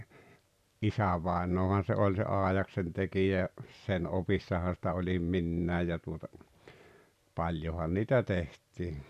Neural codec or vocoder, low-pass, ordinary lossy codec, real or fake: none; 14.4 kHz; none; real